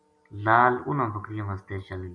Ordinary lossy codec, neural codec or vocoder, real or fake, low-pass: MP3, 64 kbps; none; real; 9.9 kHz